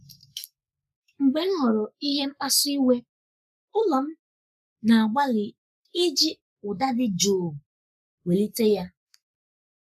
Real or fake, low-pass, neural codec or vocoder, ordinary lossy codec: fake; 14.4 kHz; codec, 44.1 kHz, 7.8 kbps, Pupu-Codec; none